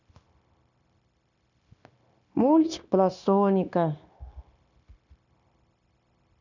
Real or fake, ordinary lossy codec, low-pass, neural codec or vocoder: fake; none; 7.2 kHz; codec, 16 kHz, 0.9 kbps, LongCat-Audio-Codec